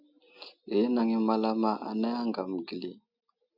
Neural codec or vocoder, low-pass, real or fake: none; 5.4 kHz; real